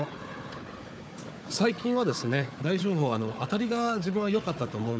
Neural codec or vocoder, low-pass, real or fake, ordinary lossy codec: codec, 16 kHz, 4 kbps, FunCodec, trained on Chinese and English, 50 frames a second; none; fake; none